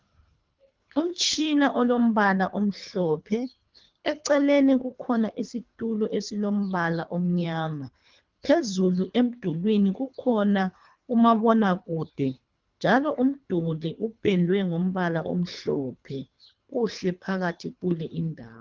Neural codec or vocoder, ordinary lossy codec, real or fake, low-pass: codec, 24 kHz, 3 kbps, HILCodec; Opus, 32 kbps; fake; 7.2 kHz